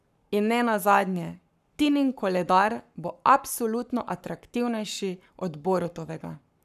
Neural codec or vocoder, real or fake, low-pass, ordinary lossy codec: codec, 44.1 kHz, 7.8 kbps, Pupu-Codec; fake; 14.4 kHz; none